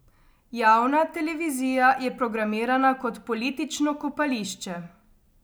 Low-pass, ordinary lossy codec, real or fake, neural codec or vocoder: none; none; real; none